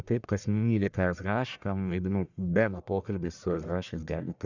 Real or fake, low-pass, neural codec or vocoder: fake; 7.2 kHz; codec, 44.1 kHz, 1.7 kbps, Pupu-Codec